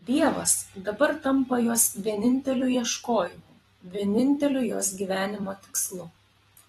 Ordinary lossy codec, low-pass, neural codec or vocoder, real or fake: AAC, 32 kbps; 19.8 kHz; vocoder, 44.1 kHz, 128 mel bands every 256 samples, BigVGAN v2; fake